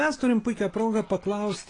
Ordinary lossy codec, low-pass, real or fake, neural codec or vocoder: AAC, 32 kbps; 9.9 kHz; real; none